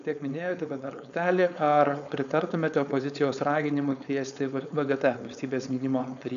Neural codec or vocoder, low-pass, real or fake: codec, 16 kHz, 4.8 kbps, FACodec; 7.2 kHz; fake